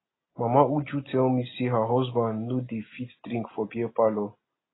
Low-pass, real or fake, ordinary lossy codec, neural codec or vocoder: 7.2 kHz; real; AAC, 16 kbps; none